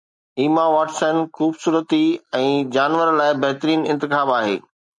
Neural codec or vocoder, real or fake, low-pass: none; real; 10.8 kHz